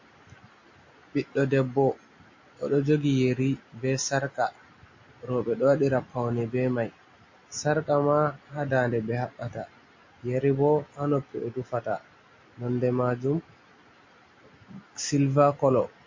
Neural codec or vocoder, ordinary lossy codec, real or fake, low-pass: none; MP3, 32 kbps; real; 7.2 kHz